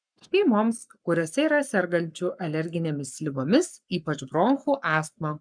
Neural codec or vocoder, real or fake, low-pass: codec, 44.1 kHz, 7.8 kbps, Pupu-Codec; fake; 9.9 kHz